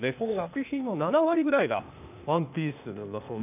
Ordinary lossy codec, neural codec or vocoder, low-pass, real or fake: none; codec, 16 kHz, 0.8 kbps, ZipCodec; 3.6 kHz; fake